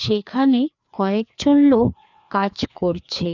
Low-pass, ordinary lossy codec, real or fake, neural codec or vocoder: 7.2 kHz; none; fake; codec, 16 kHz, 2 kbps, FreqCodec, larger model